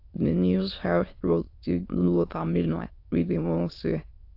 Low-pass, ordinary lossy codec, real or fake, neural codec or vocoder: 5.4 kHz; AAC, 32 kbps; fake; autoencoder, 22.05 kHz, a latent of 192 numbers a frame, VITS, trained on many speakers